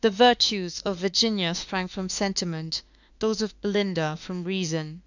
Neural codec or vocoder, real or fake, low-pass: autoencoder, 48 kHz, 32 numbers a frame, DAC-VAE, trained on Japanese speech; fake; 7.2 kHz